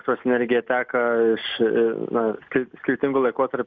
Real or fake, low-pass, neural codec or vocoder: real; 7.2 kHz; none